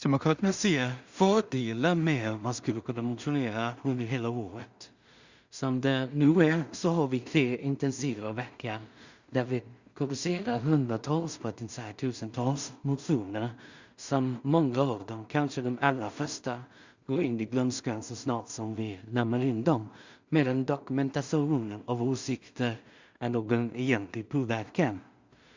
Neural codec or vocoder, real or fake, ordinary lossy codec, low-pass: codec, 16 kHz in and 24 kHz out, 0.4 kbps, LongCat-Audio-Codec, two codebook decoder; fake; Opus, 64 kbps; 7.2 kHz